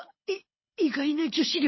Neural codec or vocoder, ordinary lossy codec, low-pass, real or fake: codec, 24 kHz, 6 kbps, HILCodec; MP3, 24 kbps; 7.2 kHz; fake